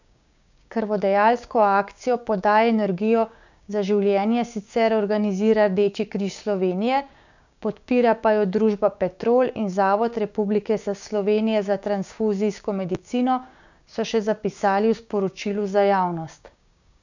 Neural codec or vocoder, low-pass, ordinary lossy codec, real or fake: codec, 16 kHz, 6 kbps, DAC; 7.2 kHz; none; fake